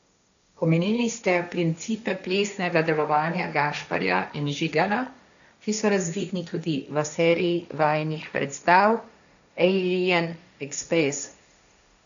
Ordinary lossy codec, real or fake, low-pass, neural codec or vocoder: none; fake; 7.2 kHz; codec, 16 kHz, 1.1 kbps, Voila-Tokenizer